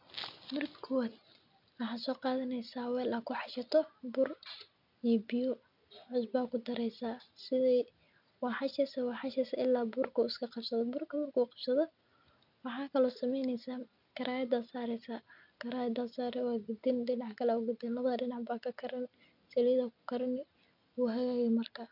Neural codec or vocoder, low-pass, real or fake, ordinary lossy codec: none; 5.4 kHz; real; none